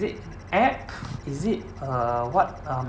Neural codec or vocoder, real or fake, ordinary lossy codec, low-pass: none; real; none; none